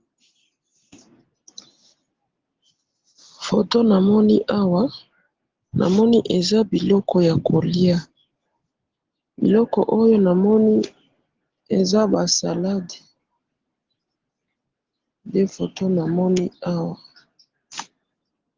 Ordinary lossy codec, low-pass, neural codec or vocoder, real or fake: Opus, 16 kbps; 7.2 kHz; none; real